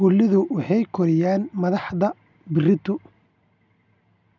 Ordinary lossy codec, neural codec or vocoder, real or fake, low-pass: none; none; real; 7.2 kHz